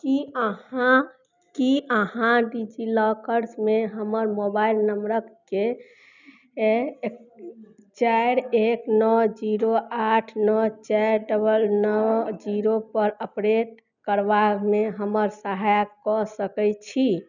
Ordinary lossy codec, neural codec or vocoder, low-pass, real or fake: none; none; 7.2 kHz; real